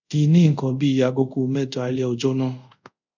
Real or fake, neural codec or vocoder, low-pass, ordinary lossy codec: fake; codec, 24 kHz, 0.5 kbps, DualCodec; 7.2 kHz; none